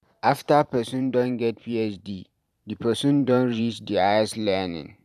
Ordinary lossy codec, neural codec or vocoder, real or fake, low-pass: none; vocoder, 44.1 kHz, 128 mel bands every 512 samples, BigVGAN v2; fake; 14.4 kHz